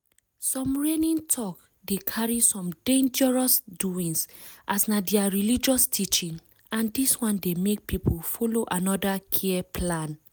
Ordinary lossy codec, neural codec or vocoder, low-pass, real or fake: none; none; none; real